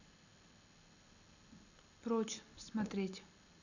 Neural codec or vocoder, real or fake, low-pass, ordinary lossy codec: none; real; 7.2 kHz; none